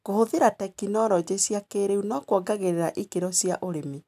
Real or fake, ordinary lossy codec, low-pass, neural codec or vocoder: real; AAC, 96 kbps; 14.4 kHz; none